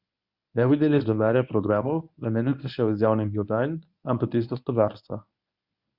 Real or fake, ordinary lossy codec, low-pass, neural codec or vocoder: fake; Opus, 64 kbps; 5.4 kHz; codec, 24 kHz, 0.9 kbps, WavTokenizer, medium speech release version 1